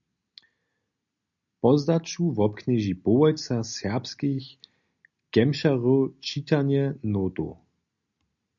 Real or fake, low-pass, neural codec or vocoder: real; 7.2 kHz; none